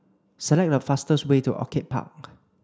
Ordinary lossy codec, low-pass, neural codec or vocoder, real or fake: none; none; none; real